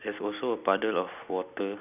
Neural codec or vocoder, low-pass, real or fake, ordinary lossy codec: none; 3.6 kHz; real; none